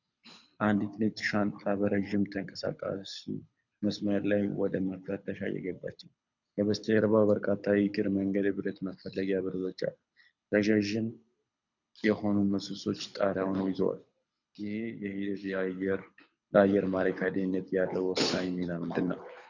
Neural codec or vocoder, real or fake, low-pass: codec, 24 kHz, 6 kbps, HILCodec; fake; 7.2 kHz